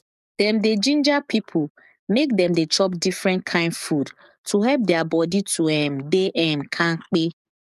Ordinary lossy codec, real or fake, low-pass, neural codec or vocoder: none; fake; 14.4 kHz; codec, 44.1 kHz, 7.8 kbps, DAC